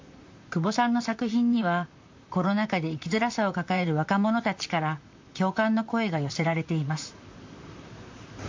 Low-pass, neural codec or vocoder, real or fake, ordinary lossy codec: 7.2 kHz; vocoder, 44.1 kHz, 128 mel bands, Pupu-Vocoder; fake; MP3, 48 kbps